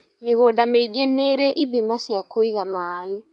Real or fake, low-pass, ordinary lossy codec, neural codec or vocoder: fake; 10.8 kHz; none; codec, 24 kHz, 1 kbps, SNAC